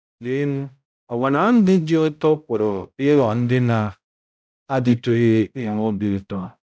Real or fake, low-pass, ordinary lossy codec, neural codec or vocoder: fake; none; none; codec, 16 kHz, 0.5 kbps, X-Codec, HuBERT features, trained on balanced general audio